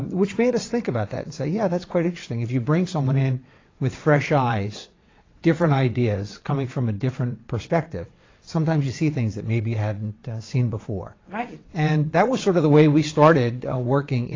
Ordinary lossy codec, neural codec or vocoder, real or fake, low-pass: AAC, 32 kbps; vocoder, 22.05 kHz, 80 mel bands, WaveNeXt; fake; 7.2 kHz